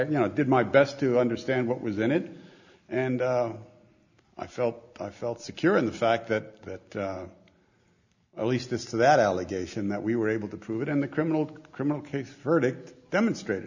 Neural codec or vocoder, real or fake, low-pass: none; real; 7.2 kHz